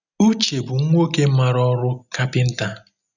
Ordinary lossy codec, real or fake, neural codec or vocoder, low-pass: none; real; none; 7.2 kHz